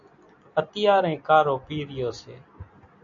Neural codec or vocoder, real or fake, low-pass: none; real; 7.2 kHz